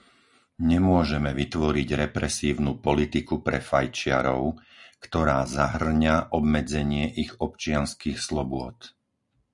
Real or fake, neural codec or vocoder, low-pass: real; none; 10.8 kHz